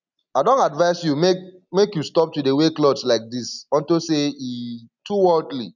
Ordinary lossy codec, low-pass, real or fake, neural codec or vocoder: none; 7.2 kHz; real; none